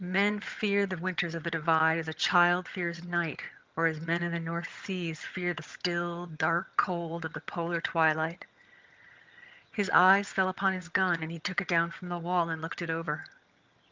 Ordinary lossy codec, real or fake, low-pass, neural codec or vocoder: Opus, 32 kbps; fake; 7.2 kHz; vocoder, 22.05 kHz, 80 mel bands, HiFi-GAN